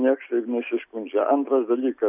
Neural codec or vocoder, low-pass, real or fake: none; 3.6 kHz; real